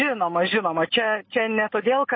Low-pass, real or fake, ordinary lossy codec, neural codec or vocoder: 7.2 kHz; real; MP3, 24 kbps; none